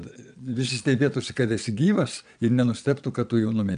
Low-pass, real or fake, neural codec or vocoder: 9.9 kHz; fake; vocoder, 22.05 kHz, 80 mel bands, Vocos